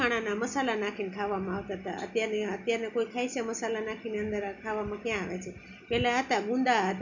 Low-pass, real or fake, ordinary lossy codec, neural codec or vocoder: 7.2 kHz; real; none; none